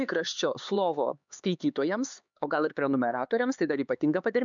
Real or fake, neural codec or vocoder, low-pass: fake; codec, 16 kHz, 4 kbps, X-Codec, HuBERT features, trained on LibriSpeech; 7.2 kHz